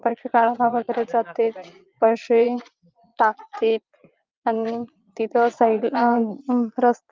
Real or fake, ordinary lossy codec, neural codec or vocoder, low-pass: fake; Opus, 24 kbps; vocoder, 22.05 kHz, 80 mel bands, Vocos; 7.2 kHz